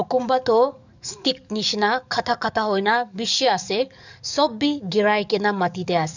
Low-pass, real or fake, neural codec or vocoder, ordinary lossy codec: 7.2 kHz; fake; codec, 44.1 kHz, 7.8 kbps, DAC; none